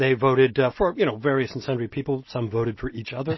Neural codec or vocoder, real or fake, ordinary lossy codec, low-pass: none; real; MP3, 24 kbps; 7.2 kHz